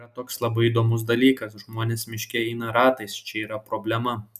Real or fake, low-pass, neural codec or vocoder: real; 14.4 kHz; none